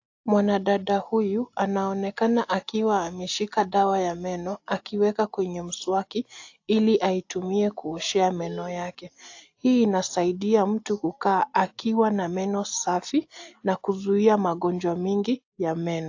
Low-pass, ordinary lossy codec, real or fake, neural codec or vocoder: 7.2 kHz; AAC, 48 kbps; real; none